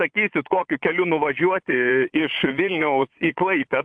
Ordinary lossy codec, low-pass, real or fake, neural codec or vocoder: AAC, 64 kbps; 9.9 kHz; fake; vocoder, 22.05 kHz, 80 mel bands, Vocos